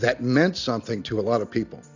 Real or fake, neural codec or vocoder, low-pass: real; none; 7.2 kHz